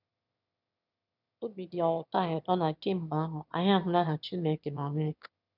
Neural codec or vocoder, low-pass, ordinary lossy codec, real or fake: autoencoder, 22.05 kHz, a latent of 192 numbers a frame, VITS, trained on one speaker; 5.4 kHz; none; fake